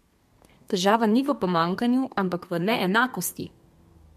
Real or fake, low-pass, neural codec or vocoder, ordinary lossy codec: fake; 14.4 kHz; codec, 32 kHz, 1.9 kbps, SNAC; MP3, 64 kbps